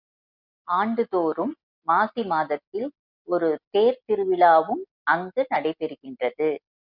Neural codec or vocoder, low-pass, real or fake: none; 5.4 kHz; real